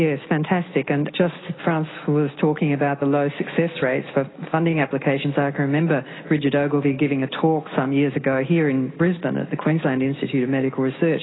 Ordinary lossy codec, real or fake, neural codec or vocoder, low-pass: AAC, 16 kbps; real; none; 7.2 kHz